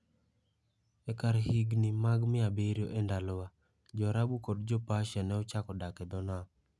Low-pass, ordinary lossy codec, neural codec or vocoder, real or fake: none; none; none; real